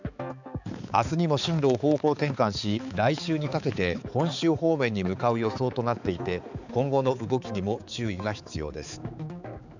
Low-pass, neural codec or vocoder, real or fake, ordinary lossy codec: 7.2 kHz; codec, 16 kHz, 4 kbps, X-Codec, HuBERT features, trained on balanced general audio; fake; none